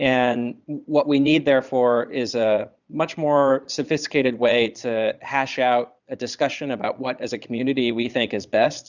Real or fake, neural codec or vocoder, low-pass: fake; vocoder, 22.05 kHz, 80 mel bands, Vocos; 7.2 kHz